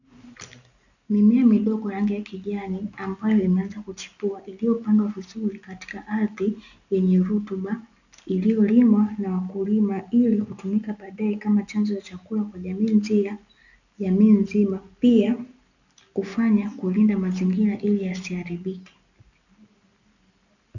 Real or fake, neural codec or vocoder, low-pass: real; none; 7.2 kHz